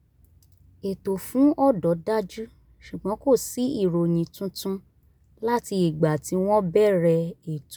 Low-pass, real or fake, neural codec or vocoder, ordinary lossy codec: none; real; none; none